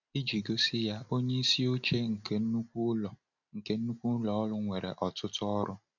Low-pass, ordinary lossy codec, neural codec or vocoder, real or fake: 7.2 kHz; none; none; real